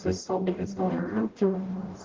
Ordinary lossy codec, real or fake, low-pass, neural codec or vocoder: Opus, 16 kbps; fake; 7.2 kHz; codec, 44.1 kHz, 0.9 kbps, DAC